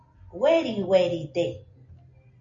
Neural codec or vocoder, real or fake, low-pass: none; real; 7.2 kHz